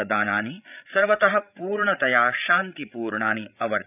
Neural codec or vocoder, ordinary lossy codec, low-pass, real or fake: codec, 16 kHz, 8 kbps, FreqCodec, larger model; none; 3.6 kHz; fake